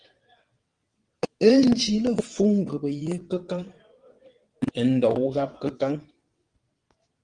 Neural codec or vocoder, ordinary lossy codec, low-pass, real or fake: vocoder, 22.05 kHz, 80 mel bands, WaveNeXt; Opus, 24 kbps; 9.9 kHz; fake